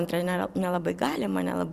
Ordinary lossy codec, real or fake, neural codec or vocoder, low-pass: Opus, 64 kbps; fake; vocoder, 44.1 kHz, 128 mel bands every 256 samples, BigVGAN v2; 14.4 kHz